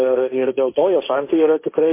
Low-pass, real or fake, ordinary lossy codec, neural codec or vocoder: 3.6 kHz; fake; MP3, 24 kbps; codec, 16 kHz, 1.1 kbps, Voila-Tokenizer